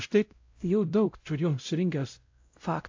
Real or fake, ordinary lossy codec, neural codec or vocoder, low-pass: fake; AAC, 48 kbps; codec, 16 kHz, 0.5 kbps, X-Codec, WavLM features, trained on Multilingual LibriSpeech; 7.2 kHz